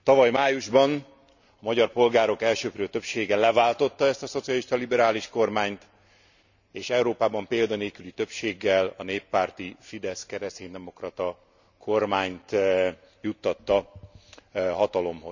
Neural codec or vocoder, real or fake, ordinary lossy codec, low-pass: none; real; none; 7.2 kHz